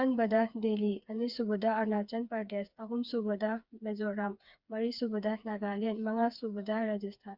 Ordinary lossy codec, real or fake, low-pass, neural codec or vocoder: Opus, 64 kbps; fake; 5.4 kHz; codec, 16 kHz, 4 kbps, FreqCodec, smaller model